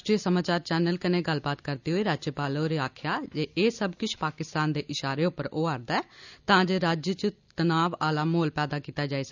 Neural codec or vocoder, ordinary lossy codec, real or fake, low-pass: none; none; real; 7.2 kHz